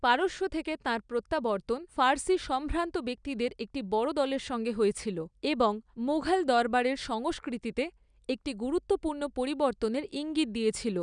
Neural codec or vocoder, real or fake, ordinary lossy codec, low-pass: none; real; none; 9.9 kHz